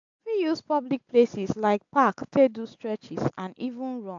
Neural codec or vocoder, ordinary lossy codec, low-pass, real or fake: none; AAC, 64 kbps; 7.2 kHz; real